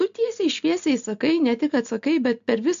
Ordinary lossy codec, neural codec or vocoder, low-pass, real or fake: AAC, 64 kbps; none; 7.2 kHz; real